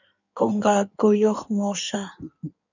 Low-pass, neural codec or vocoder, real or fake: 7.2 kHz; codec, 16 kHz in and 24 kHz out, 1.1 kbps, FireRedTTS-2 codec; fake